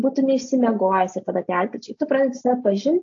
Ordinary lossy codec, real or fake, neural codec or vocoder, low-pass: AAC, 48 kbps; real; none; 7.2 kHz